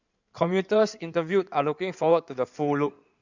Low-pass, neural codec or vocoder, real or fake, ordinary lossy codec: 7.2 kHz; codec, 16 kHz in and 24 kHz out, 2.2 kbps, FireRedTTS-2 codec; fake; none